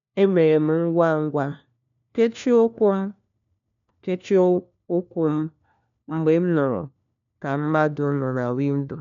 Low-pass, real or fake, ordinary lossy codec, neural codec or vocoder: 7.2 kHz; fake; none; codec, 16 kHz, 1 kbps, FunCodec, trained on LibriTTS, 50 frames a second